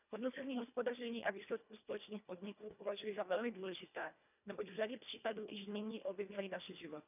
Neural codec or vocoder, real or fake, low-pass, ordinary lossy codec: codec, 24 kHz, 1.5 kbps, HILCodec; fake; 3.6 kHz; none